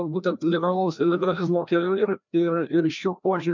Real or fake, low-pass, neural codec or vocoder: fake; 7.2 kHz; codec, 16 kHz, 1 kbps, FreqCodec, larger model